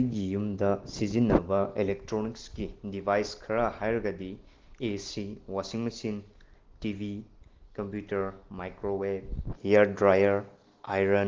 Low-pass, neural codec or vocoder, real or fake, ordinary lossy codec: 7.2 kHz; none; real; Opus, 16 kbps